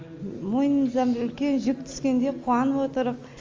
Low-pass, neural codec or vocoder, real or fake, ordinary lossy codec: 7.2 kHz; none; real; Opus, 32 kbps